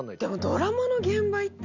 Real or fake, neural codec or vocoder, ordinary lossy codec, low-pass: real; none; none; 7.2 kHz